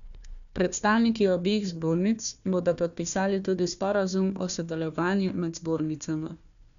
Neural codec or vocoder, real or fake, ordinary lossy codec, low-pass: codec, 16 kHz, 1 kbps, FunCodec, trained on Chinese and English, 50 frames a second; fake; none; 7.2 kHz